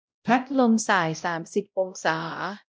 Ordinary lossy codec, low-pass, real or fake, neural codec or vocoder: none; none; fake; codec, 16 kHz, 0.5 kbps, X-Codec, WavLM features, trained on Multilingual LibriSpeech